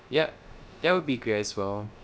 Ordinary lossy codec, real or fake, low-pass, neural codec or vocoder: none; fake; none; codec, 16 kHz, 0.3 kbps, FocalCodec